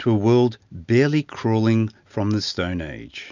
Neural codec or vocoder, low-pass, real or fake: none; 7.2 kHz; real